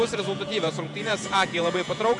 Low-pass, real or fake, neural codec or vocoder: 10.8 kHz; fake; vocoder, 48 kHz, 128 mel bands, Vocos